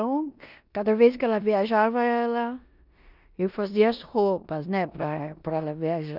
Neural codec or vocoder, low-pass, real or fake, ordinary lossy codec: codec, 16 kHz in and 24 kHz out, 0.9 kbps, LongCat-Audio-Codec, four codebook decoder; 5.4 kHz; fake; none